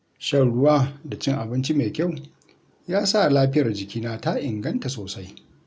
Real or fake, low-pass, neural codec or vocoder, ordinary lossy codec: real; none; none; none